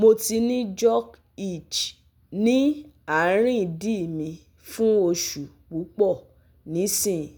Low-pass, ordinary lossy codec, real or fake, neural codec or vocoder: none; none; real; none